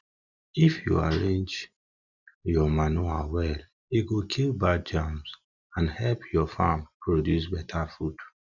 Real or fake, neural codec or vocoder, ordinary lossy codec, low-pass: real; none; none; 7.2 kHz